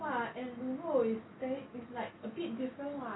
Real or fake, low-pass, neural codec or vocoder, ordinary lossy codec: real; 7.2 kHz; none; AAC, 16 kbps